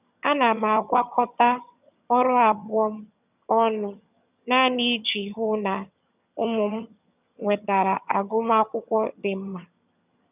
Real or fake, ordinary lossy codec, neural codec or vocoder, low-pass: fake; none; vocoder, 22.05 kHz, 80 mel bands, HiFi-GAN; 3.6 kHz